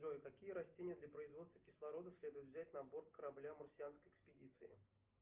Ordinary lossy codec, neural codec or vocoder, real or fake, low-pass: Opus, 32 kbps; none; real; 3.6 kHz